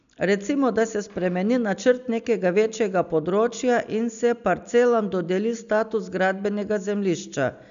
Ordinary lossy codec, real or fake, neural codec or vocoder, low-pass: none; real; none; 7.2 kHz